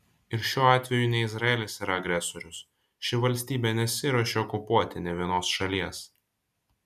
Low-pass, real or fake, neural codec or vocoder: 14.4 kHz; real; none